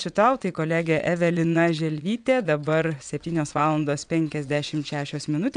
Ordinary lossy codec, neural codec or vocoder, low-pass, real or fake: MP3, 96 kbps; vocoder, 22.05 kHz, 80 mel bands, WaveNeXt; 9.9 kHz; fake